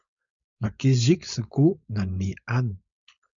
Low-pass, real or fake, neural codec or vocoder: 7.2 kHz; fake; codec, 16 kHz, 4.8 kbps, FACodec